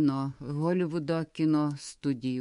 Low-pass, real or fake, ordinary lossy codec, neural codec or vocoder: 10.8 kHz; fake; MP3, 64 kbps; codec, 24 kHz, 3.1 kbps, DualCodec